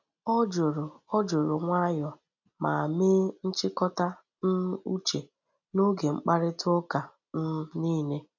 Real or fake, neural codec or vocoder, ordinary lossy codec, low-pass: real; none; none; 7.2 kHz